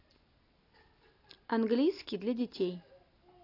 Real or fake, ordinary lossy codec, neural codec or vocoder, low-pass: real; AAC, 32 kbps; none; 5.4 kHz